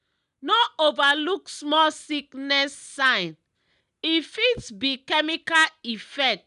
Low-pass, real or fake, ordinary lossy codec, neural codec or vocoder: 10.8 kHz; real; none; none